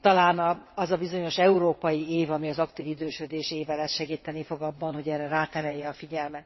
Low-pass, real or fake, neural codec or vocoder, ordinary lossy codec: 7.2 kHz; fake; vocoder, 22.05 kHz, 80 mel bands, WaveNeXt; MP3, 24 kbps